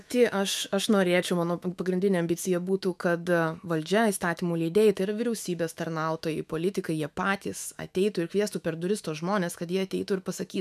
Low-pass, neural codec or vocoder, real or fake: 14.4 kHz; autoencoder, 48 kHz, 128 numbers a frame, DAC-VAE, trained on Japanese speech; fake